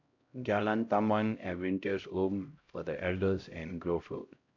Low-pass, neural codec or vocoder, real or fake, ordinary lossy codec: 7.2 kHz; codec, 16 kHz, 0.5 kbps, X-Codec, HuBERT features, trained on LibriSpeech; fake; AAC, 48 kbps